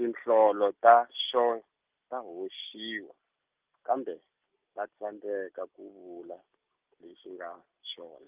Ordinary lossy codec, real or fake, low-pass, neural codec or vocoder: Opus, 32 kbps; real; 3.6 kHz; none